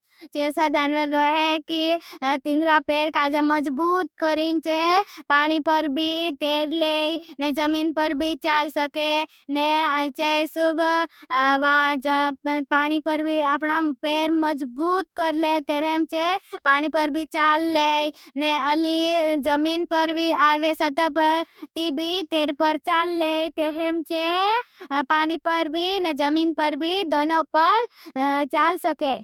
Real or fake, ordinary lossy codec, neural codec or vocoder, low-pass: fake; MP3, 96 kbps; codec, 44.1 kHz, 2.6 kbps, DAC; 19.8 kHz